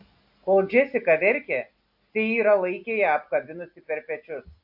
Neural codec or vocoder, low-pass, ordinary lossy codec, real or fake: none; 5.4 kHz; AAC, 48 kbps; real